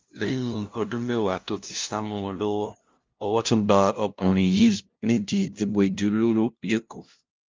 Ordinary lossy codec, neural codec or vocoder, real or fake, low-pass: Opus, 24 kbps; codec, 16 kHz, 0.5 kbps, FunCodec, trained on LibriTTS, 25 frames a second; fake; 7.2 kHz